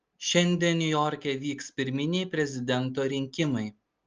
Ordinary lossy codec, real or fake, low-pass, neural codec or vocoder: Opus, 24 kbps; real; 7.2 kHz; none